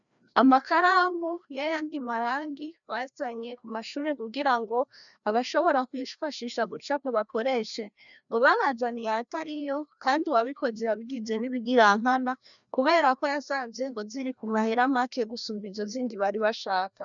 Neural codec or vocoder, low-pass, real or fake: codec, 16 kHz, 1 kbps, FreqCodec, larger model; 7.2 kHz; fake